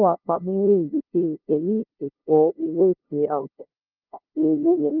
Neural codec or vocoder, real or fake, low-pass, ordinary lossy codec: codec, 16 kHz, 0.5 kbps, FunCodec, trained on LibriTTS, 25 frames a second; fake; 5.4 kHz; Opus, 24 kbps